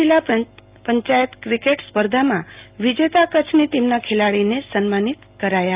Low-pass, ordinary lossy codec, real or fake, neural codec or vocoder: 3.6 kHz; Opus, 24 kbps; real; none